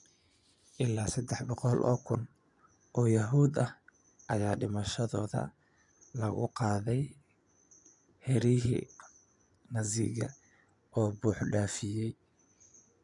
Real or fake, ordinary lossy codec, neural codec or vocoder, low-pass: fake; none; vocoder, 24 kHz, 100 mel bands, Vocos; 10.8 kHz